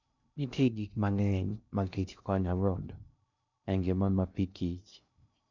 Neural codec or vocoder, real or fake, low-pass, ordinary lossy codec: codec, 16 kHz in and 24 kHz out, 0.6 kbps, FocalCodec, streaming, 2048 codes; fake; 7.2 kHz; none